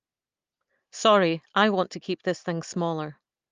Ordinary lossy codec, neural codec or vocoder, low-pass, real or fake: Opus, 32 kbps; none; 7.2 kHz; real